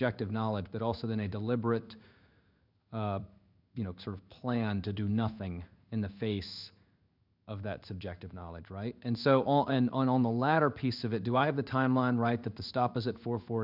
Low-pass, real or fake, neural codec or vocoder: 5.4 kHz; fake; codec, 16 kHz in and 24 kHz out, 1 kbps, XY-Tokenizer